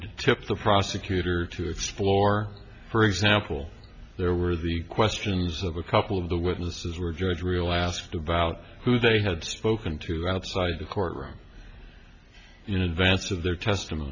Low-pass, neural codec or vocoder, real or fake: 7.2 kHz; none; real